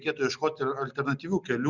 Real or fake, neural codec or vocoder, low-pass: real; none; 7.2 kHz